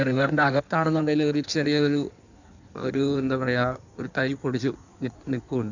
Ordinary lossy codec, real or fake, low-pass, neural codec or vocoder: none; fake; 7.2 kHz; codec, 16 kHz in and 24 kHz out, 1.1 kbps, FireRedTTS-2 codec